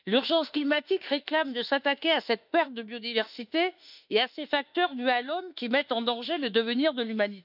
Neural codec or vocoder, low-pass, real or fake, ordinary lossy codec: autoencoder, 48 kHz, 32 numbers a frame, DAC-VAE, trained on Japanese speech; 5.4 kHz; fake; none